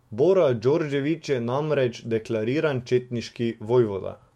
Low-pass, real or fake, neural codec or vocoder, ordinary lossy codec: 19.8 kHz; fake; autoencoder, 48 kHz, 128 numbers a frame, DAC-VAE, trained on Japanese speech; MP3, 64 kbps